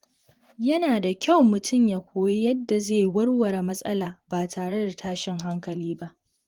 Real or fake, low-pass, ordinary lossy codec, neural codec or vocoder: fake; 19.8 kHz; Opus, 24 kbps; vocoder, 44.1 kHz, 128 mel bands, Pupu-Vocoder